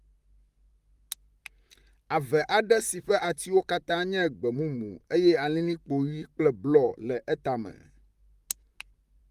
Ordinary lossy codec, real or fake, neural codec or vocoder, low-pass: Opus, 32 kbps; fake; vocoder, 44.1 kHz, 128 mel bands, Pupu-Vocoder; 14.4 kHz